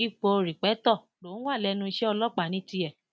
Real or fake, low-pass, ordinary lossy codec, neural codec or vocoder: real; none; none; none